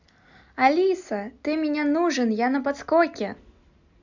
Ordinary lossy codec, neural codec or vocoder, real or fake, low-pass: none; none; real; 7.2 kHz